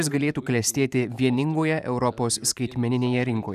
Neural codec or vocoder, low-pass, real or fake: vocoder, 44.1 kHz, 128 mel bands every 512 samples, BigVGAN v2; 14.4 kHz; fake